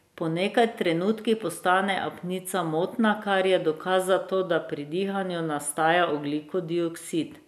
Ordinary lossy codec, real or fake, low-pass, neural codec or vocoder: none; real; 14.4 kHz; none